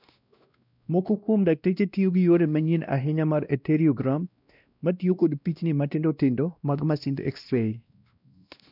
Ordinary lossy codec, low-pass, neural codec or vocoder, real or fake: none; 5.4 kHz; codec, 16 kHz, 1 kbps, X-Codec, WavLM features, trained on Multilingual LibriSpeech; fake